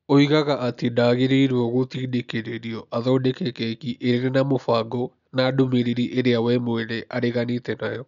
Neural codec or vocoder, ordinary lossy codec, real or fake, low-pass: none; none; real; 7.2 kHz